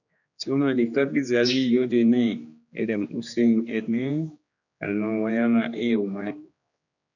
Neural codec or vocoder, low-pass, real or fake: codec, 16 kHz, 2 kbps, X-Codec, HuBERT features, trained on general audio; 7.2 kHz; fake